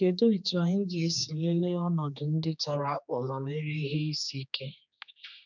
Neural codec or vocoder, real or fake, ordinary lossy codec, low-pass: codec, 16 kHz, 2 kbps, X-Codec, HuBERT features, trained on general audio; fake; none; 7.2 kHz